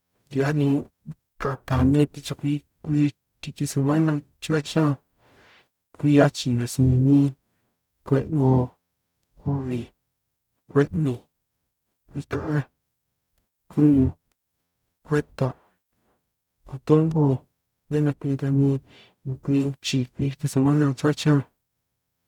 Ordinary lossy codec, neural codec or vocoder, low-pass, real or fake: none; codec, 44.1 kHz, 0.9 kbps, DAC; 19.8 kHz; fake